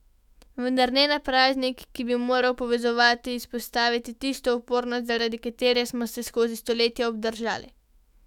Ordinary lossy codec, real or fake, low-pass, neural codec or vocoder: none; fake; 19.8 kHz; autoencoder, 48 kHz, 128 numbers a frame, DAC-VAE, trained on Japanese speech